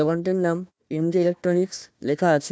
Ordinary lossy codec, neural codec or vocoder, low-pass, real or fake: none; codec, 16 kHz, 1 kbps, FunCodec, trained on Chinese and English, 50 frames a second; none; fake